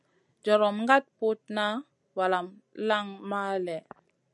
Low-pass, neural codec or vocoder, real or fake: 10.8 kHz; none; real